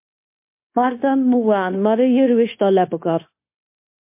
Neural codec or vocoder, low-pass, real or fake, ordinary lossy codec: codec, 16 kHz, 0.9 kbps, LongCat-Audio-Codec; 3.6 kHz; fake; MP3, 24 kbps